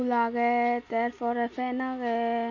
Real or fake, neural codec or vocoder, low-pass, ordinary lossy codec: real; none; 7.2 kHz; none